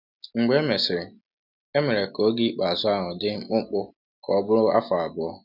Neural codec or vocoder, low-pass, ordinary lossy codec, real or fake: none; 5.4 kHz; none; real